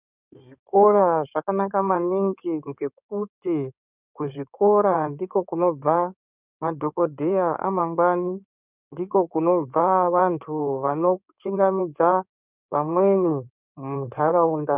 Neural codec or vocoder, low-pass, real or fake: codec, 16 kHz in and 24 kHz out, 2.2 kbps, FireRedTTS-2 codec; 3.6 kHz; fake